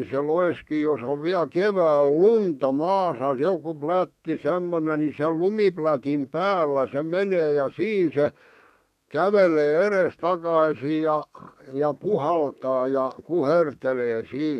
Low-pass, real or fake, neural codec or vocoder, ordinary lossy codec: 14.4 kHz; fake; codec, 32 kHz, 1.9 kbps, SNAC; none